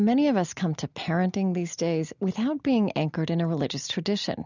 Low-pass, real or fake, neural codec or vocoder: 7.2 kHz; real; none